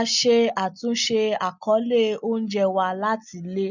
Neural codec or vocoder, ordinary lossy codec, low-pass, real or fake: none; none; 7.2 kHz; real